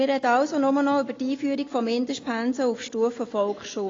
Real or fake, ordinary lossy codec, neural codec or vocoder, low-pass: real; AAC, 32 kbps; none; 7.2 kHz